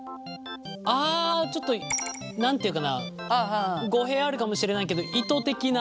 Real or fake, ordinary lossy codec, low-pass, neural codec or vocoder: real; none; none; none